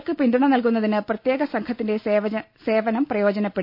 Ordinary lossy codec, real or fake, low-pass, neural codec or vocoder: none; real; 5.4 kHz; none